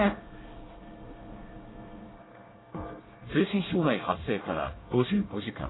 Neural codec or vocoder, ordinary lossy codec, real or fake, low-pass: codec, 24 kHz, 1 kbps, SNAC; AAC, 16 kbps; fake; 7.2 kHz